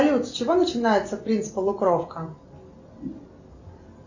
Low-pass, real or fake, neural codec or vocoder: 7.2 kHz; real; none